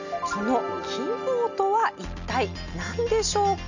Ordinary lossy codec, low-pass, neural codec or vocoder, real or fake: none; 7.2 kHz; none; real